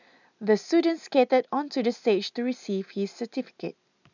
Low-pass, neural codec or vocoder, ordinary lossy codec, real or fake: 7.2 kHz; none; none; real